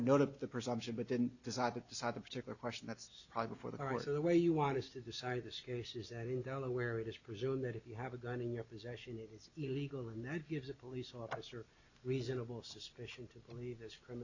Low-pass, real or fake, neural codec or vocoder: 7.2 kHz; real; none